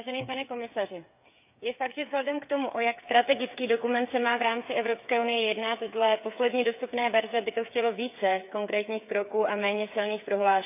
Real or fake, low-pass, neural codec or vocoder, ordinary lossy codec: fake; 3.6 kHz; codec, 16 kHz, 8 kbps, FreqCodec, smaller model; none